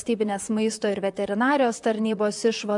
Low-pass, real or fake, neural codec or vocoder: 10.8 kHz; fake; vocoder, 44.1 kHz, 128 mel bands, Pupu-Vocoder